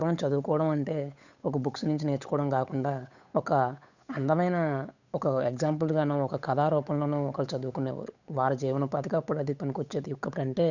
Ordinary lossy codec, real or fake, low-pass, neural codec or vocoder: none; fake; 7.2 kHz; codec, 16 kHz, 8 kbps, FunCodec, trained on Chinese and English, 25 frames a second